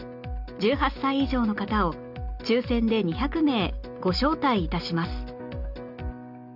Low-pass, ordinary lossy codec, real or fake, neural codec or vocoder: 5.4 kHz; none; real; none